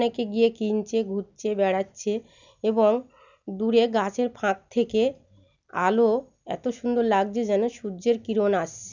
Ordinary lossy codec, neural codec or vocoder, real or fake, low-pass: none; none; real; 7.2 kHz